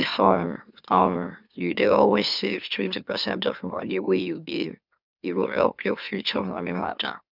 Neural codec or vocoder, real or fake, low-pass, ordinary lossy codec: autoencoder, 44.1 kHz, a latent of 192 numbers a frame, MeloTTS; fake; 5.4 kHz; none